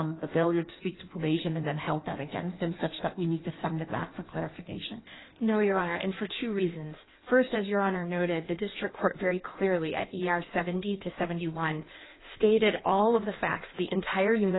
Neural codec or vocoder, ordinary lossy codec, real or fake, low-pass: codec, 16 kHz in and 24 kHz out, 1.1 kbps, FireRedTTS-2 codec; AAC, 16 kbps; fake; 7.2 kHz